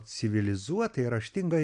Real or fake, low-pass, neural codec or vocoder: real; 9.9 kHz; none